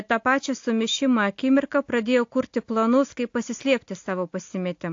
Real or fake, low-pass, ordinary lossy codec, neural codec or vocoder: real; 7.2 kHz; AAC, 48 kbps; none